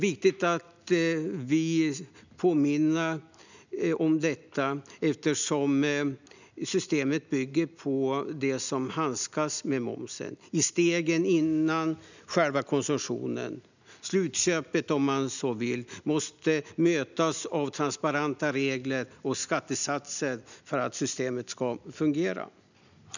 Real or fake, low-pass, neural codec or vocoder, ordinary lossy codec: real; 7.2 kHz; none; none